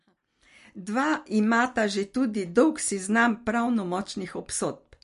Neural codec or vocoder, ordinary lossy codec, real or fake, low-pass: none; MP3, 48 kbps; real; 10.8 kHz